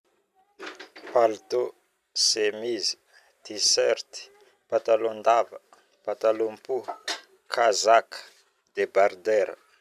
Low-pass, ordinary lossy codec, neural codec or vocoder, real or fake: 14.4 kHz; none; none; real